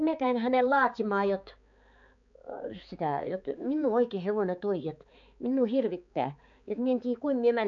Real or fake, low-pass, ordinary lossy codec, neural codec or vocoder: fake; 7.2 kHz; AAC, 64 kbps; codec, 16 kHz, 4 kbps, X-Codec, HuBERT features, trained on balanced general audio